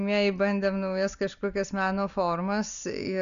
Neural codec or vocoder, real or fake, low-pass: none; real; 7.2 kHz